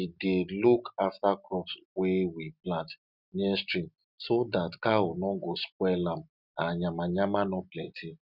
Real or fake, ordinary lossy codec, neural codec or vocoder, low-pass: real; none; none; 5.4 kHz